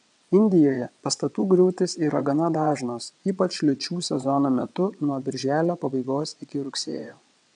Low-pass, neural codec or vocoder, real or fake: 9.9 kHz; vocoder, 22.05 kHz, 80 mel bands, WaveNeXt; fake